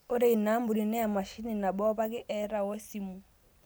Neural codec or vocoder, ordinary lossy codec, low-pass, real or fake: none; none; none; real